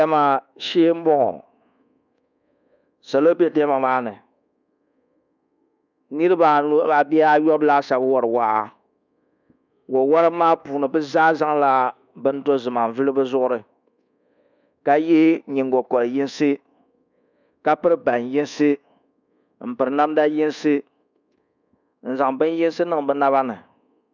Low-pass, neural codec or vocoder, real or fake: 7.2 kHz; codec, 24 kHz, 1.2 kbps, DualCodec; fake